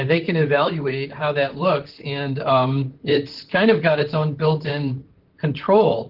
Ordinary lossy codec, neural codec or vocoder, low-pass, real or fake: Opus, 16 kbps; vocoder, 44.1 kHz, 128 mel bands, Pupu-Vocoder; 5.4 kHz; fake